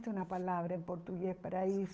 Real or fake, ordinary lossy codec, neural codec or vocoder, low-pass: fake; none; codec, 16 kHz, 8 kbps, FunCodec, trained on Chinese and English, 25 frames a second; none